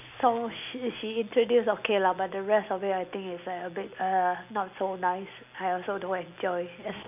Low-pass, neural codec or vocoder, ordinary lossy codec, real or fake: 3.6 kHz; none; none; real